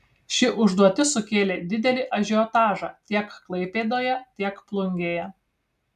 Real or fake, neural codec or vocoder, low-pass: real; none; 14.4 kHz